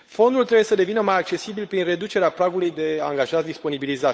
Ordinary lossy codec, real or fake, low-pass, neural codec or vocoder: none; fake; none; codec, 16 kHz, 8 kbps, FunCodec, trained on Chinese and English, 25 frames a second